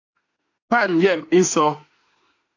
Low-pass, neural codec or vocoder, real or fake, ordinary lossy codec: 7.2 kHz; autoencoder, 48 kHz, 32 numbers a frame, DAC-VAE, trained on Japanese speech; fake; AAC, 48 kbps